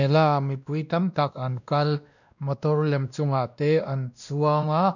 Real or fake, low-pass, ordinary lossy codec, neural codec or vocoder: fake; 7.2 kHz; none; codec, 16 kHz, 1 kbps, X-Codec, WavLM features, trained on Multilingual LibriSpeech